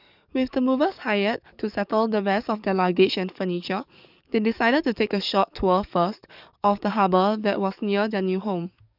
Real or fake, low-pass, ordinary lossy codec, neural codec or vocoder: fake; 5.4 kHz; none; codec, 44.1 kHz, 7.8 kbps, DAC